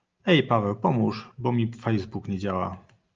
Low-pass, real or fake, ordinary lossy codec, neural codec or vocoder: 7.2 kHz; real; Opus, 24 kbps; none